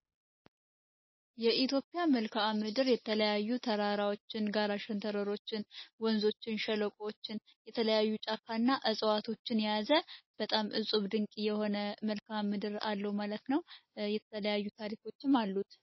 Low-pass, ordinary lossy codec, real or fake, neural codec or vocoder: 7.2 kHz; MP3, 24 kbps; real; none